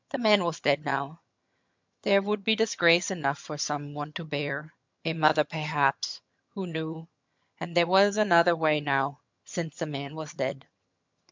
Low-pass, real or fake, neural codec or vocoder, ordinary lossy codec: 7.2 kHz; fake; vocoder, 22.05 kHz, 80 mel bands, HiFi-GAN; MP3, 64 kbps